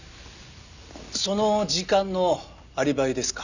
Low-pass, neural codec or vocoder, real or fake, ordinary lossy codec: 7.2 kHz; none; real; none